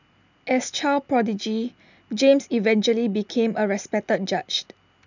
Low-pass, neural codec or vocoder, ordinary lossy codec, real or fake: 7.2 kHz; none; none; real